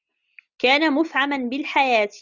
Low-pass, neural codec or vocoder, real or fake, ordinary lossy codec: 7.2 kHz; none; real; Opus, 64 kbps